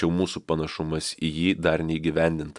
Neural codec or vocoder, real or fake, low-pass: none; real; 10.8 kHz